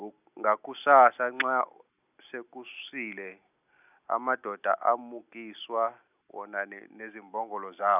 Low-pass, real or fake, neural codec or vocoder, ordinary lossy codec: 3.6 kHz; real; none; none